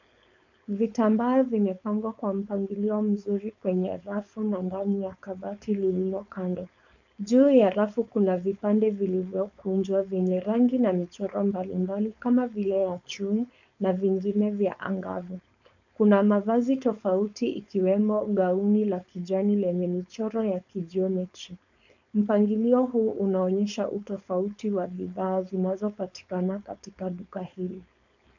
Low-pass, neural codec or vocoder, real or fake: 7.2 kHz; codec, 16 kHz, 4.8 kbps, FACodec; fake